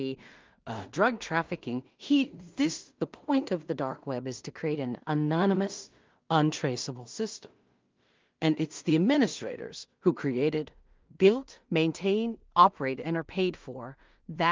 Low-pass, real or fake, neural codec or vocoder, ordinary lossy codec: 7.2 kHz; fake; codec, 16 kHz in and 24 kHz out, 0.4 kbps, LongCat-Audio-Codec, two codebook decoder; Opus, 32 kbps